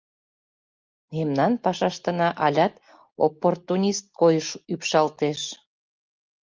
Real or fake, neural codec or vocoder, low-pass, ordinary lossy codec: real; none; 7.2 kHz; Opus, 24 kbps